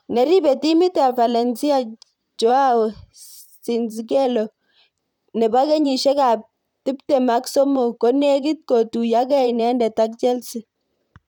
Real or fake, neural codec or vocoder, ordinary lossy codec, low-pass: fake; vocoder, 44.1 kHz, 128 mel bands, Pupu-Vocoder; none; 19.8 kHz